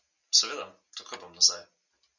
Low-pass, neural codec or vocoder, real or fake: 7.2 kHz; none; real